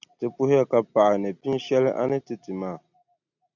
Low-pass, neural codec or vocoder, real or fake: 7.2 kHz; none; real